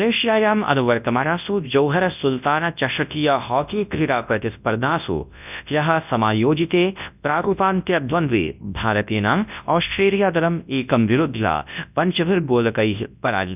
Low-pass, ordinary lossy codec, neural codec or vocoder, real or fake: 3.6 kHz; none; codec, 24 kHz, 0.9 kbps, WavTokenizer, large speech release; fake